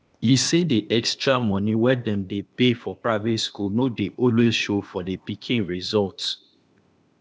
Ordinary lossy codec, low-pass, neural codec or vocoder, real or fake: none; none; codec, 16 kHz, 0.8 kbps, ZipCodec; fake